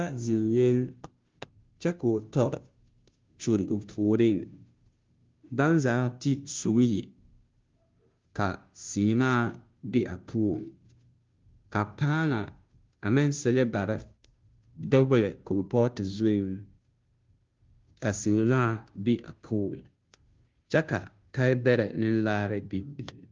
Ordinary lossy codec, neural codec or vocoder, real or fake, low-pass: Opus, 32 kbps; codec, 16 kHz, 0.5 kbps, FunCodec, trained on Chinese and English, 25 frames a second; fake; 7.2 kHz